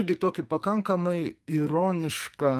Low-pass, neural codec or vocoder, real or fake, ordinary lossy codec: 14.4 kHz; codec, 44.1 kHz, 2.6 kbps, SNAC; fake; Opus, 32 kbps